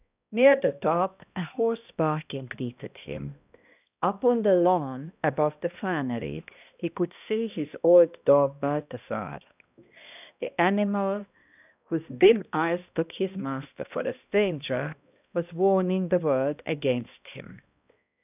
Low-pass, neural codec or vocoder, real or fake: 3.6 kHz; codec, 16 kHz, 1 kbps, X-Codec, HuBERT features, trained on balanced general audio; fake